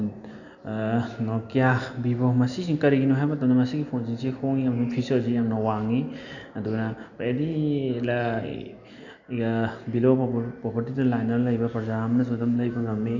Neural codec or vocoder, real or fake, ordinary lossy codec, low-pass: none; real; none; 7.2 kHz